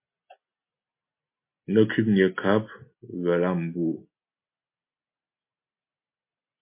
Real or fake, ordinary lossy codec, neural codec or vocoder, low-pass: real; MP3, 24 kbps; none; 3.6 kHz